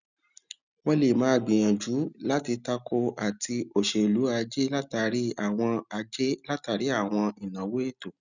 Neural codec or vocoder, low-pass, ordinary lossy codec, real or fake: none; 7.2 kHz; none; real